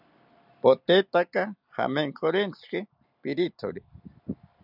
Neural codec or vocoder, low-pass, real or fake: none; 5.4 kHz; real